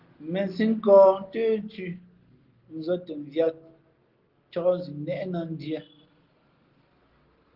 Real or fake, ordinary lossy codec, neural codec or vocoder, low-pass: real; Opus, 16 kbps; none; 5.4 kHz